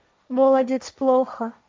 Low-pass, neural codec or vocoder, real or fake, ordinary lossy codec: none; codec, 16 kHz, 1.1 kbps, Voila-Tokenizer; fake; none